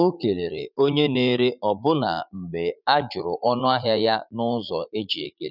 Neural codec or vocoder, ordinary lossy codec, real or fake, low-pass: vocoder, 44.1 kHz, 80 mel bands, Vocos; none; fake; 5.4 kHz